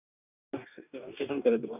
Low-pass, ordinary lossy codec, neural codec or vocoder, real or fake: 3.6 kHz; none; codec, 44.1 kHz, 2.6 kbps, DAC; fake